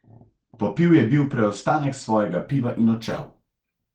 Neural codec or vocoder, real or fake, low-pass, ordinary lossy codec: none; real; 19.8 kHz; Opus, 16 kbps